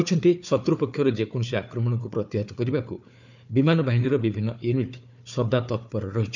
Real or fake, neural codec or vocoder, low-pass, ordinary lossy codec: fake; codec, 16 kHz, 4 kbps, FunCodec, trained on Chinese and English, 50 frames a second; 7.2 kHz; none